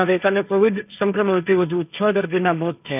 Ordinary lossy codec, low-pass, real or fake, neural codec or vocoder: none; 3.6 kHz; fake; codec, 16 kHz, 1.1 kbps, Voila-Tokenizer